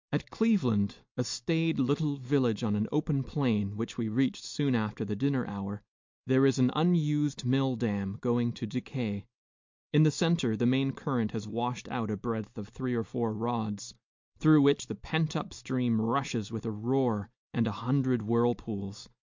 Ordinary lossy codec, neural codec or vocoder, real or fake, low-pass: MP3, 64 kbps; none; real; 7.2 kHz